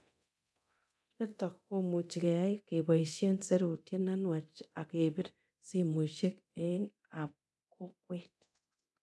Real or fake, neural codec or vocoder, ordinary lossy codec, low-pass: fake; codec, 24 kHz, 0.9 kbps, DualCodec; none; none